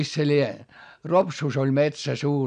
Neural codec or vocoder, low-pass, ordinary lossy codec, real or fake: none; 9.9 kHz; none; real